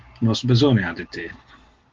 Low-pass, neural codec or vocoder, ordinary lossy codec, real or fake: 7.2 kHz; none; Opus, 16 kbps; real